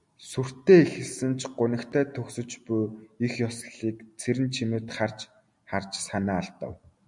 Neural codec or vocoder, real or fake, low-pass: none; real; 10.8 kHz